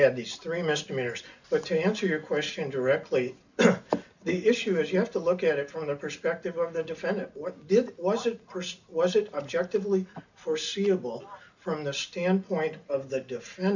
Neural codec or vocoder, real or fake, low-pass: none; real; 7.2 kHz